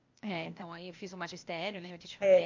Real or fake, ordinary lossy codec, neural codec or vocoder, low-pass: fake; MP3, 48 kbps; codec, 16 kHz, 0.8 kbps, ZipCodec; 7.2 kHz